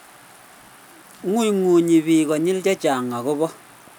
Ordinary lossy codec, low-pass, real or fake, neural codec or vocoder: none; none; real; none